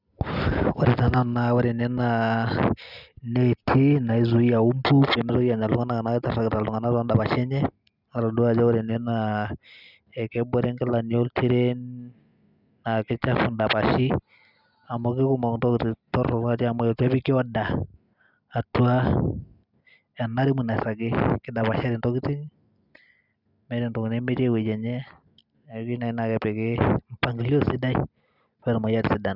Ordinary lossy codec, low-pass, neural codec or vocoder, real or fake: none; 5.4 kHz; none; real